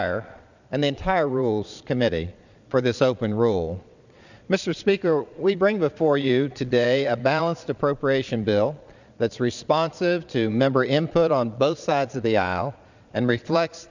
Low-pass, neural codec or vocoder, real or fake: 7.2 kHz; vocoder, 22.05 kHz, 80 mel bands, Vocos; fake